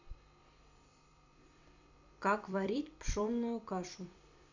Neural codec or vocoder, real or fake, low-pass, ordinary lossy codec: vocoder, 44.1 kHz, 128 mel bands every 256 samples, BigVGAN v2; fake; 7.2 kHz; AAC, 48 kbps